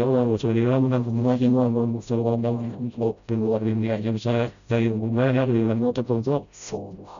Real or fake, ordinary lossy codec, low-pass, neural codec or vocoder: fake; none; 7.2 kHz; codec, 16 kHz, 0.5 kbps, FreqCodec, smaller model